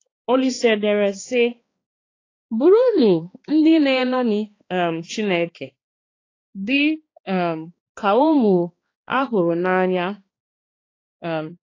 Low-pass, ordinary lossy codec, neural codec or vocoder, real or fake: 7.2 kHz; AAC, 32 kbps; codec, 16 kHz, 2 kbps, X-Codec, HuBERT features, trained on balanced general audio; fake